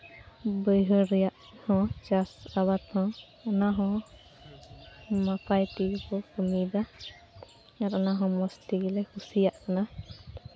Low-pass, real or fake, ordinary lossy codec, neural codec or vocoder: none; real; none; none